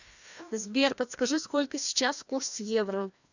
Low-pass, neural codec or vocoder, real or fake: 7.2 kHz; codec, 16 kHz, 1 kbps, FreqCodec, larger model; fake